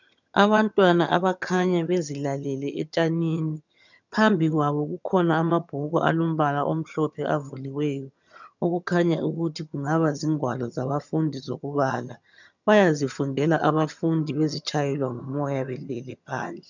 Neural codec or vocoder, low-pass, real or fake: vocoder, 22.05 kHz, 80 mel bands, HiFi-GAN; 7.2 kHz; fake